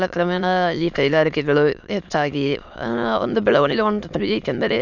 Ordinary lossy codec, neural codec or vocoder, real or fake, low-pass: none; autoencoder, 22.05 kHz, a latent of 192 numbers a frame, VITS, trained on many speakers; fake; 7.2 kHz